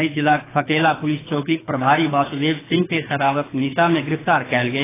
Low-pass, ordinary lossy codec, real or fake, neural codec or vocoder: 3.6 kHz; AAC, 16 kbps; fake; codec, 24 kHz, 6 kbps, HILCodec